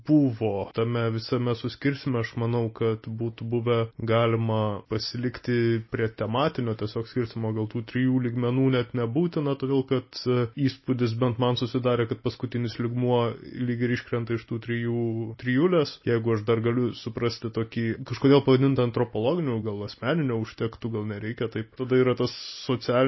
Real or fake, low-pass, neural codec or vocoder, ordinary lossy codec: real; 7.2 kHz; none; MP3, 24 kbps